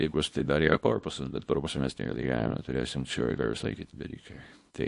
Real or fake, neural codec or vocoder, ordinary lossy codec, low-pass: fake; codec, 24 kHz, 0.9 kbps, WavTokenizer, small release; MP3, 48 kbps; 10.8 kHz